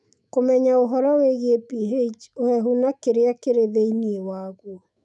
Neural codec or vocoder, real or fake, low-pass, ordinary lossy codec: autoencoder, 48 kHz, 128 numbers a frame, DAC-VAE, trained on Japanese speech; fake; 10.8 kHz; none